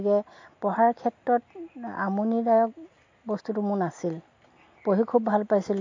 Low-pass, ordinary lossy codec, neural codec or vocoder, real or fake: 7.2 kHz; MP3, 48 kbps; none; real